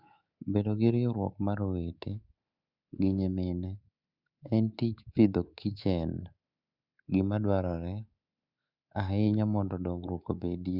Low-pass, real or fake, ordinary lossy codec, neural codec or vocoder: 5.4 kHz; fake; Opus, 64 kbps; codec, 24 kHz, 3.1 kbps, DualCodec